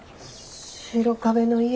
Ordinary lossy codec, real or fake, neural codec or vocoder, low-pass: none; real; none; none